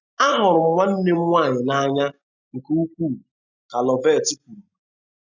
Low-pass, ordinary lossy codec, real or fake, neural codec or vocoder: 7.2 kHz; none; real; none